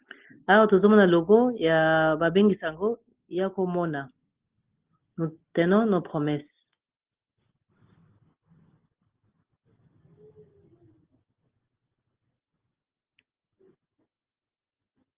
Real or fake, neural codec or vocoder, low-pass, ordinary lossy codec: real; none; 3.6 kHz; Opus, 16 kbps